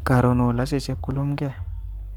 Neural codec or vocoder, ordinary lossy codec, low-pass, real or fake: codec, 44.1 kHz, 7.8 kbps, Pupu-Codec; none; 19.8 kHz; fake